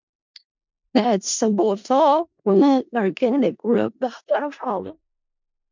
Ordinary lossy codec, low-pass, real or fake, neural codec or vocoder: MP3, 64 kbps; 7.2 kHz; fake; codec, 16 kHz in and 24 kHz out, 0.4 kbps, LongCat-Audio-Codec, four codebook decoder